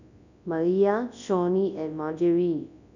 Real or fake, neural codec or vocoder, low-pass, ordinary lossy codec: fake; codec, 24 kHz, 0.9 kbps, WavTokenizer, large speech release; 7.2 kHz; none